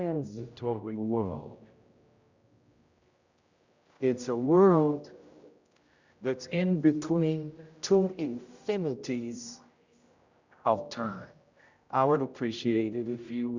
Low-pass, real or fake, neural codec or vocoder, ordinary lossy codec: 7.2 kHz; fake; codec, 16 kHz, 0.5 kbps, X-Codec, HuBERT features, trained on general audio; Opus, 64 kbps